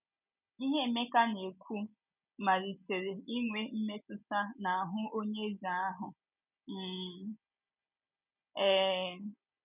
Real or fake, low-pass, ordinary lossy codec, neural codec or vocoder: real; 3.6 kHz; none; none